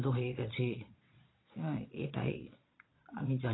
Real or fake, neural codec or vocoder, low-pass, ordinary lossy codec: fake; vocoder, 22.05 kHz, 80 mel bands, Vocos; 7.2 kHz; AAC, 16 kbps